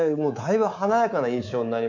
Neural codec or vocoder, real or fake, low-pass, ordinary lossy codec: codec, 24 kHz, 3.1 kbps, DualCodec; fake; 7.2 kHz; none